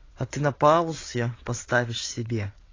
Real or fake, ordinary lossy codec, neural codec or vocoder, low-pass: fake; AAC, 32 kbps; vocoder, 22.05 kHz, 80 mel bands, WaveNeXt; 7.2 kHz